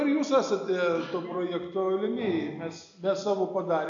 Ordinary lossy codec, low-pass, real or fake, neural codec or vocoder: AAC, 64 kbps; 7.2 kHz; real; none